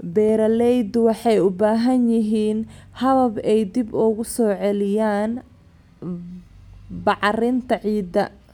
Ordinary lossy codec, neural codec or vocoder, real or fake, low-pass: none; none; real; 19.8 kHz